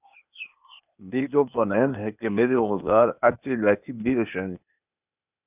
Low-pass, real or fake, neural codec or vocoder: 3.6 kHz; fake; codec, 16 kHz, 0.8 kbps, ZipCodec